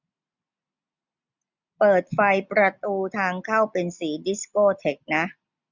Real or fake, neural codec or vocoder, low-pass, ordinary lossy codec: real; none; 7.2 kHz; none